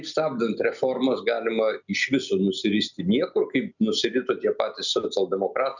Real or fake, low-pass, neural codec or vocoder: real; 7.2 kHz; none